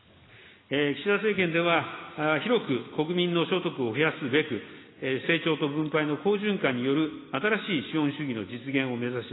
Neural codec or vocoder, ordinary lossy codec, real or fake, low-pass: none; AAC, 16 kbps; real; 7.2 kHz